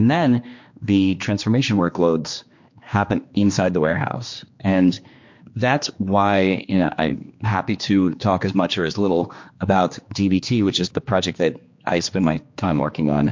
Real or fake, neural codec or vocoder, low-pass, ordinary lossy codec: fake; codec, 16 kHz, 2 kbps, X-Codec, HuBERT features, trained on general audio; 7.2 kHz; MP3, 48 kbps